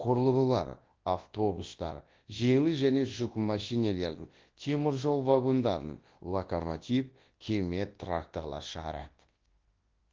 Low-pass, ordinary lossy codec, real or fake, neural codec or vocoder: 7.2 kHz; Opus, 16 kbps; fake; codec, 24 kHz, 0.9 kbps, WavTokenizer, large speech release